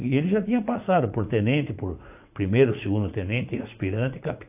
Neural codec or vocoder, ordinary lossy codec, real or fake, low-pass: vocoder, 44.1 kHz, 80 mel bands, Vocos; AAC, 32 kbps; fake; 3.6 kHz